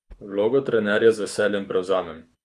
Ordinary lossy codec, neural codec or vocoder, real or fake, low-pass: none; codec, 24 kHz, 6 kbps, HILCodec; fake; none